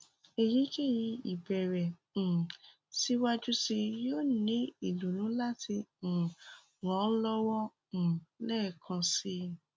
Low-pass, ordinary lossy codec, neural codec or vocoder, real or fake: none; none; none; real